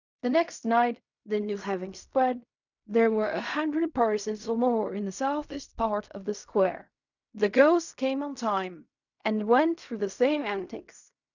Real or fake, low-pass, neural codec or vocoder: fake; 7.2 kHz; codec, 16 kHz in and 24 kHz out, 0.4 kbps, LongCat-Audio-Codec, fine tuned four codebook decoder